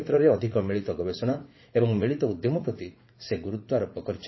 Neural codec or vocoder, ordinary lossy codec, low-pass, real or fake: vocoder, 44.1 kHz, 80 mel bands, Vocos; MP3, 24 kbps; 7.2 kHz; fake